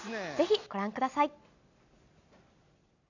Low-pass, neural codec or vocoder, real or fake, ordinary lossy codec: 7.2 kHz; none; real; none